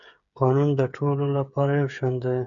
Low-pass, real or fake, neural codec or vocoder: 7.2 kHz; fake; codec, 16 kHz, 8 kbps, FreqCodec, smaller model